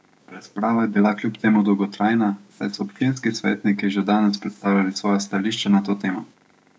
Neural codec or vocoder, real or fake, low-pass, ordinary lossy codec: codec, 16 kHz, 6 kbps, DAC; fake; none; none